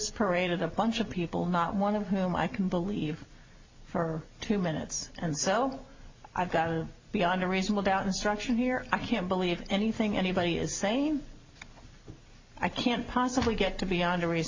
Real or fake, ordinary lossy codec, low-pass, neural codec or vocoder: real; MP3, 64 kbps; 7.2 kHz; none